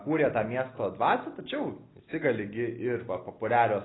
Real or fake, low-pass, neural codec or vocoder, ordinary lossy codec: real; 7.2 kHz; none; AAC, 16 kbps